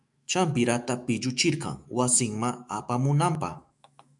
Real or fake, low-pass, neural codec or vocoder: fake; 10.8 kHz; autoencoder, 48 kHz, 128 numbers a frame, DAC-VAE, trained on Japanese speech